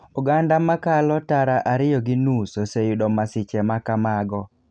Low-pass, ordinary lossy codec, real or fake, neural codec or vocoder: 9.9 kHz; none; real; none